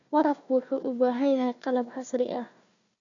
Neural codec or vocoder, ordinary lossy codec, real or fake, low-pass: codec, 16 kHz, 1 kbps, FunCodec, trained on Chinese and English, 50 frames a second; none; fake; 7.2 kHz